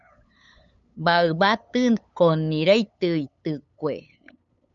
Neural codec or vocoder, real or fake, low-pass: codec, 16 kHz, 8 kbps, FunCodec, trained on LibriTTS, 25 frames a second; fake; 7.2 kHz